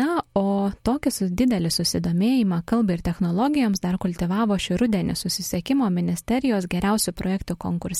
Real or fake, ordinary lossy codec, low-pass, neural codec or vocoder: real; MP3, 64 kbps; 19.8 kHz; none